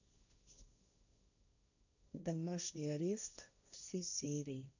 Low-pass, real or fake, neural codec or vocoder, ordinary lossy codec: 7.2 kHz; fake; codec, 16 kHz, 1.1 kbps, Voila-Tokenizer; none